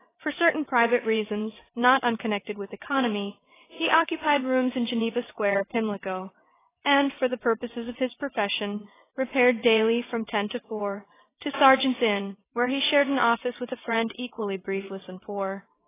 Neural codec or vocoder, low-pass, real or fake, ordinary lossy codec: vocoder, 22.05 kHz, 80 mel bands, WaveNeXt; 3.6 kHz; fake; AAC, 16 kbps